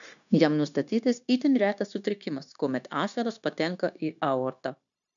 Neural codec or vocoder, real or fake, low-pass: codec, 16 kHz, 0.9 kbps, LongCat-Audio-Codec; fake; 7.2 kHz